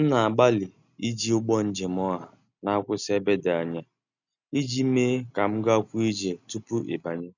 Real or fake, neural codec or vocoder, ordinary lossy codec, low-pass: real; none; none; 7.2 kHz